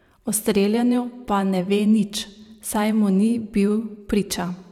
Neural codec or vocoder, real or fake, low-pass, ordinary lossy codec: vocoder, 44.1 kHz, 128 mel bands every 512 samples, BigVGAN v2; fake; 19.8 kHz; none